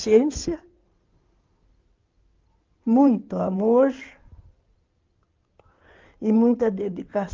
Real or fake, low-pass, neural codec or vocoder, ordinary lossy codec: fake; 7.2 kHz; codec, 16 kHz in and 24 kHz out, 2.2 kbps, FireRedTTS-2 codec; Opus, 32 kbps